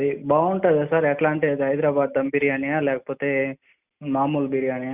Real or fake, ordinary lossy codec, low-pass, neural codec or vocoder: real; Opus, 64 kbps; 3.6 kHz; none